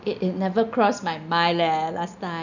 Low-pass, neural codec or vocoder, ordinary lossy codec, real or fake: 7.2 kHz; none; none; real